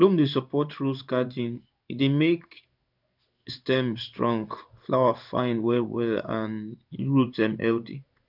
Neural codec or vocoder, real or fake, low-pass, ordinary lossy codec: codec, 16 kHz in and 24 kHz out, 1 kbps, XY-Tokenizer; fake; 5.4 kHz; none